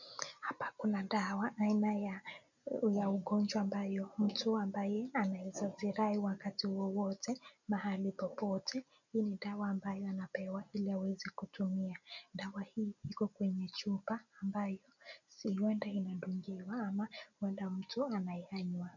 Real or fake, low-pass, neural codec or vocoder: real; 7.2 kHz; none